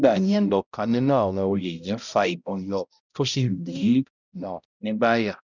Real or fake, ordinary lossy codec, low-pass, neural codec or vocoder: fake; none; 7.2 kHz; codec, 16 kHz, 0.5 kbps, X-Codec, HuBERT features, trained on balanced general audio